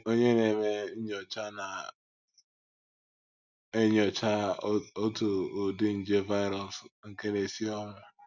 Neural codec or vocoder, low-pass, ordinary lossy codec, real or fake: none; 7.2 kHz; none; real